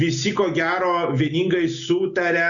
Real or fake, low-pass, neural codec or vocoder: real; 7.2 kHz; none